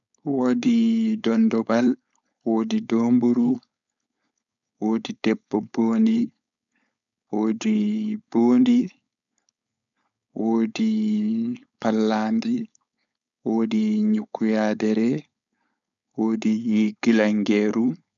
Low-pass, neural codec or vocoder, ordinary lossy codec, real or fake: 7.2 kHz; codec, 16 kHz, 4.8 kbps, FACodec; none; fake